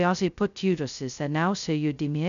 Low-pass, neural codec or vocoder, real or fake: 7.2 kHz; codec, 16 kHz, 0.2 kbps, FocalCodec; fake